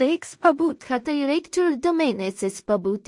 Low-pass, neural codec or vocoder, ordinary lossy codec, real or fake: 10.8 kHz; codec, 16 kHz in and 24 kHz out, 0.4 kbps, LongCat-Audio-Codec, two codebook decoder; MP3, 48 kbps; fake